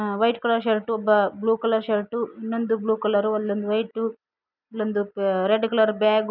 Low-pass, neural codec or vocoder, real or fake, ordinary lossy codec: 5.4 kHz; none; real; none